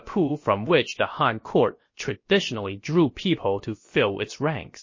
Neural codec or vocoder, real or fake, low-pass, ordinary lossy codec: codec, 16 kHz, about 1 kbps, DyCAST, with the encoder's durations; fake; 7.2 kHz; MP3, 32 kbps